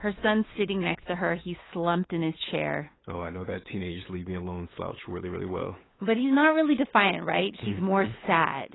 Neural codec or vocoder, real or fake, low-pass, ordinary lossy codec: codec, 16 kHz, 8 kbps, FunCodec, trained on LibriTTS, 25 frames a second; fake; 7.2 kHz; AAC, 16 kbps